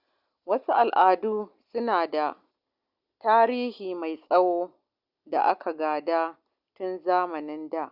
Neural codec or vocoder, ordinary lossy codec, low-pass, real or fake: none; Opus, 64 kbps; 5.4 kHz; real